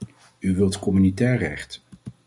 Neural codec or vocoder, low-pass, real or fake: none; 10.8 kHz; real